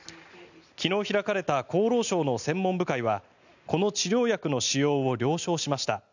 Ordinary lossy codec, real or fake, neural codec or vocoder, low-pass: none; real; none; 7.2 kHz